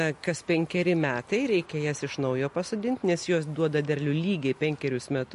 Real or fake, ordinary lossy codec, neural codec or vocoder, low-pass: real; MP3, 48 kbps; none; 14.4 kHz